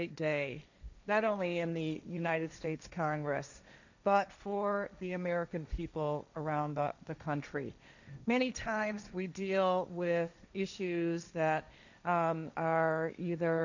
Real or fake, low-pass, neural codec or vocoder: fake; 7.2 kHz; codec, 16 kHz, 1.1 kbps, Voila-Tokenizer